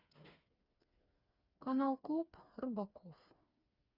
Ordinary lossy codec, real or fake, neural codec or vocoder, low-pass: Opus, 64 kbps; fake; codec, 16 kHz, 4 kbps, FreqCodec, smaller model; 5.4 kHz